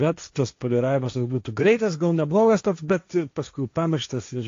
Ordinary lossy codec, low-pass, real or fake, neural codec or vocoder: AAC, 48 kbps; 7.2 kHz; fake; codec, 16 kHz, 1.1 kbps, Voila-Tokenizer